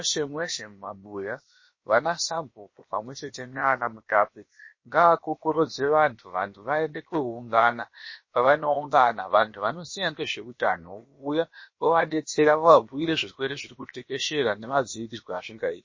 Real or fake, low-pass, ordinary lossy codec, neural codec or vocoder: fake; 7.2 kHz; MP3, 32 kbps; codec, 16 kHz, about 1 kbps, DyCAST, with the encoder's durations